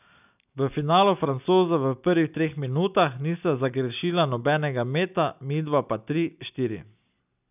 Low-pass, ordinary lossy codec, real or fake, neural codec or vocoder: 3.6 kHz; none; real; none